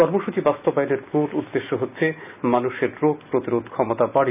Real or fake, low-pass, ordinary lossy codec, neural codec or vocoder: real; 3.6 kHz; none; none